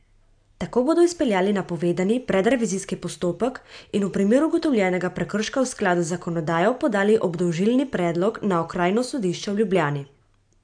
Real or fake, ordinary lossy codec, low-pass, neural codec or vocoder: real; AAC, 64 kbps; 9.9 kHz; none